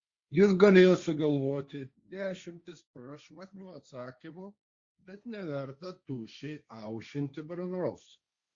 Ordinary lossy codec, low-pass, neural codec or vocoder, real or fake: Opus, 64 kbps; 7.2 kHz; codec, 16 kHz, 1.1 kbps, Voila-Tokenizer; fake